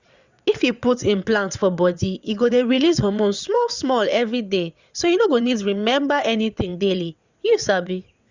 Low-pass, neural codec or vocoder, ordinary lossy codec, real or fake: 7.2 kHz; vocoder, 22.05 kHz, 80 mel bands, WaveNeXt; Opus, 64 kbps; fake